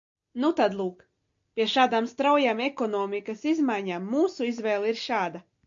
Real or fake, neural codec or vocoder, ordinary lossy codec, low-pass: real; none; AAC, 64 kbps; 7.2 kHz